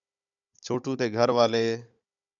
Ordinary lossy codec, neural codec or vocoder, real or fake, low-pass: MP3, 96 kbps; codec, 16 kHz, 16 kbps, FunCodec, trained on Chinese and English, 50 frames a second; fake; 7.2 kHz